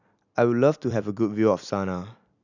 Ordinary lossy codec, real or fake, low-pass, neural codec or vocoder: none; fake; 7.2 kHz; vocoder, 44.1 kHz, 128 mel bands every 256 samples, BigVGAN v2